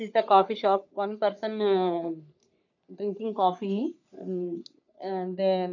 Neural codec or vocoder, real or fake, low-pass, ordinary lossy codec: codec, 44.1 kHz, 3.4 kbps, Pupu-Codec; fake; 7.2 kHz; none